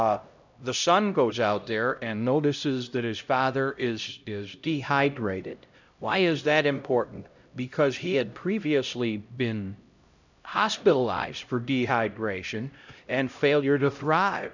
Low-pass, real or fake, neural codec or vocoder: 7.2 kHz; fake; codec, 16 kHz, 0.5 kbps, X-Codec, HuBERT features, trained on LibriSpeech